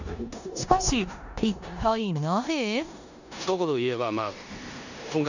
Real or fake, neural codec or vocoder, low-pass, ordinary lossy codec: fake; codec, 16 kHz in and 24 kHz out, 0.9 kbps, LongCat-Audio-Codec, four codebook decoder; 7.2 kHz; none